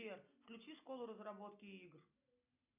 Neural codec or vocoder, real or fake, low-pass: none; real; 3.6 kHz